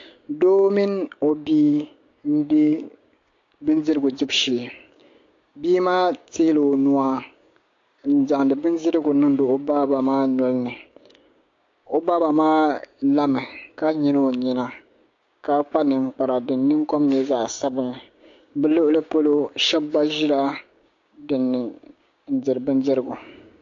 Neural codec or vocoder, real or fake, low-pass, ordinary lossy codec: codec, 16 kHz, 6 kbps, DAC; fake; 7.2 kHz; AAC, 48 kbps